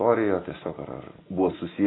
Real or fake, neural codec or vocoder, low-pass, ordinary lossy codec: real; none; 7.2 kHz; AAC, 16 kbps